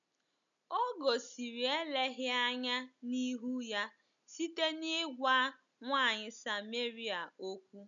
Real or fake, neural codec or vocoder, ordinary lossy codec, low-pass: real; none; none; 7.2 kHz